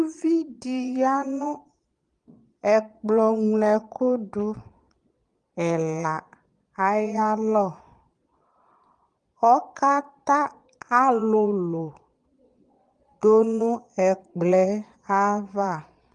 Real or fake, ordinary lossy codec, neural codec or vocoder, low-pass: fake; Opus, 24 kbps; vocoder, 22.05 kHz, 80 mel bands, Vocos; 9.9 kHz